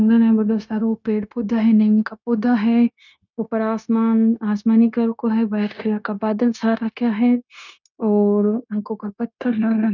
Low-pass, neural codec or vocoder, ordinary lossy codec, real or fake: 7.2 kHz; codec, 16 kHz, 0.9 kbps, LongCat-Audio-Codec; none; fake